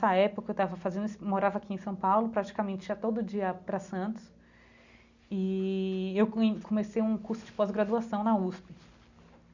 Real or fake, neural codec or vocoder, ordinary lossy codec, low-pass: real; none; none; 7.2 kHz